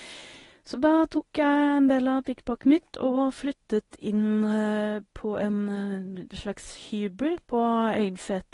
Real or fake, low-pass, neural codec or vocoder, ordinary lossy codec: fake; 10.8 kHz; codec, 24 kHz, 0.9 kbps, WavTokenizer, medium speech release version 1; AAC, 32 kbps